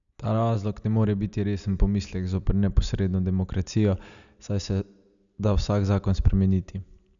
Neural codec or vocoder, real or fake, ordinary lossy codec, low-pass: none; real; none; 7.2 kHz